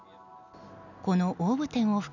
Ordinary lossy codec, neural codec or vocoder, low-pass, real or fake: none; none; 7.2 kHz; real